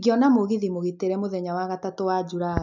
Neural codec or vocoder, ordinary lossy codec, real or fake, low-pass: none; none; real; 7.2 kHz